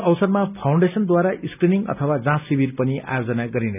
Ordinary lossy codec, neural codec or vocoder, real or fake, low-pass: none; none; real; 3.6 kHz